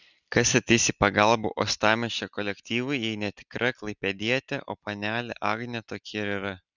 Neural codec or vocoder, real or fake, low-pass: none; real; 7.2 kHz